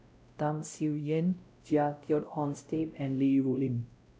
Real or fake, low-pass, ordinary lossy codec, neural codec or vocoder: fake; none; none; codec, 16 kHz, 0.5 kbps, X-Codec, WavLM features, trained on Multilingual LibriSpeech